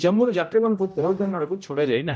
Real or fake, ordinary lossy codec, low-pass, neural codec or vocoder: fake; none; none; codec, 16 kHz, 0.5 kbps, X-Codec, HuBERT features, trained on general audio